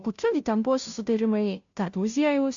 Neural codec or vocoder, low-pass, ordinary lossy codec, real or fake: codec, 16 kHz, 0.5 kbps, FunCodec, trained on Chinese and English, 25 frames a second; 7.2 kHz; AAC, 64 kbps; fake